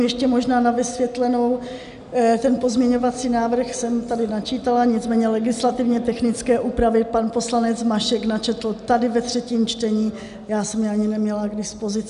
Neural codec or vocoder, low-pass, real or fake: none; 10.8 kHz; real